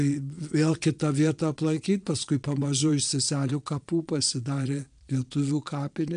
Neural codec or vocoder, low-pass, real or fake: vocoder, 22.05 kHz, 80 mel bands, Vocos; 9.9 kHz; fake